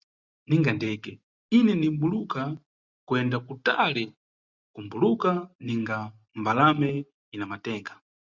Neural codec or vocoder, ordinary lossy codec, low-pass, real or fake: none; Opus, 64 kbps; 7.2 kHz; real